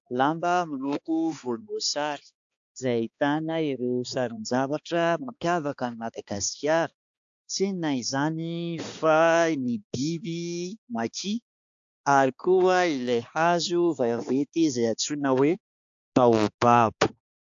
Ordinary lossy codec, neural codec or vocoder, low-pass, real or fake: AAC, 64 kbps; codec, 16 kHz, 2 kbps, X-Codec, HuBERT features, trained on balanced general audio; 7.2 kHz; fake